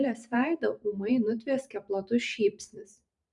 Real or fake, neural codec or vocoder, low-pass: fake; vocoder, 44.1 kHz, 128 mel bands every 512 samples, BigVGAN v2; 10.8 kHz